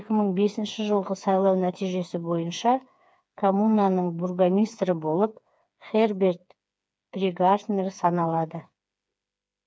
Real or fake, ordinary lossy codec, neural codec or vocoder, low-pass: fake; none; codec, 16 kHz, 4 kbps, FreqCodec, smaller model; none